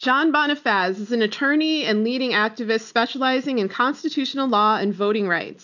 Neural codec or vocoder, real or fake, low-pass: none; real; 7.2 kHz